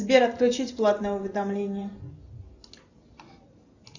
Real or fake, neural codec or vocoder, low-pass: real; none; 7.2 kHz